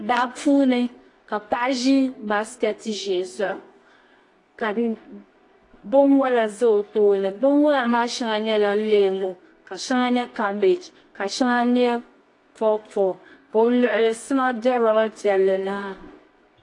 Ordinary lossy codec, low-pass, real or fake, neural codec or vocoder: AAC, 48 kbps; 10.8 kHz; fake; codec, 24 kHz, 0.9 kbps, WavTokenizer, medium music audio release